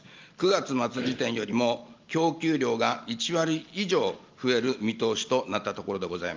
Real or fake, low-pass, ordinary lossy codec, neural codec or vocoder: real; 7.2 kHz; Opus, 24 kbps; none